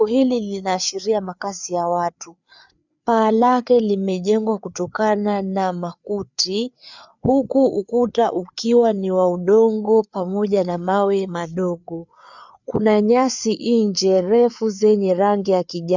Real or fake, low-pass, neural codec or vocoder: fake; 7.2 kHz; codec, 16 kHz, 4 kbps, FreqCodec, larger model